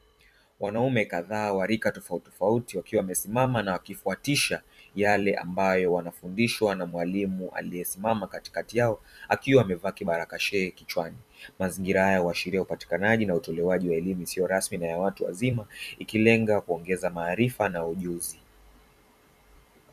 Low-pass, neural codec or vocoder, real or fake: 14.4 kHz; vocoder, 44.1 kHz, 128 mel bands every 256 samples, BigVGAN v2; fake